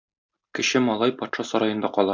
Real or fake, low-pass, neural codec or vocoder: real; 7.2 kHz; none